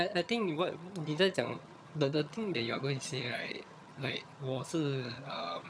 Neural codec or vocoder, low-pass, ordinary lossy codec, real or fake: vocoder, 22.05 kHz, 80 mel bands, HiFi-GAN; none; none; fake